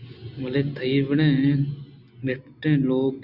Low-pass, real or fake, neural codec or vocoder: 5.4 kHz; real; none